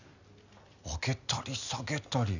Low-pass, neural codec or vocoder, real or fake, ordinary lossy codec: 7.2 kHz; none; real; none